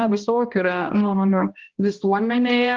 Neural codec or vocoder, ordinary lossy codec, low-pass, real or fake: codec, 16 kHz, 1 kbps, X-Codec, HuBERT features, trained on balanced general audio; Opus, 24 kbps; 7.2 kHz; fake